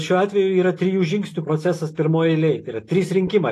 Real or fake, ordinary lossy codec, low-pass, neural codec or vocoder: real; AAC, 48 kbps; 14.4 kHz; none